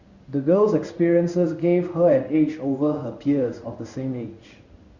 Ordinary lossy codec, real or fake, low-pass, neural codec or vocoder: Opus, 64 kbps; fake; 7.2 kHz; codec, 16 kHz in and 24 kHz out, 1 kbps, XY-Tokenizer